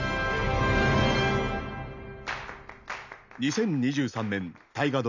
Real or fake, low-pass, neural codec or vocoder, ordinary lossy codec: real; 7.2 kHz; none; none